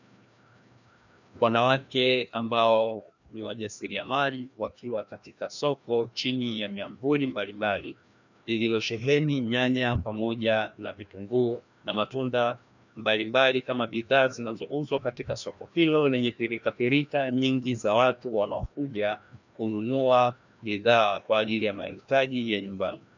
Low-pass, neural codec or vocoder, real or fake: 7.2 kHz; codec, 16 kHz, 1 kbps, FreqCodec, larger model; fake